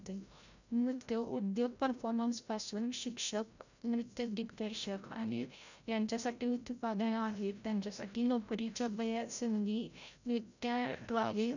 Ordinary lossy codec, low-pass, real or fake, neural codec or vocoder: none; 7.2 kHz; fake; codec, 16 kHz, 0.5 kbps, FreqCodec, larger model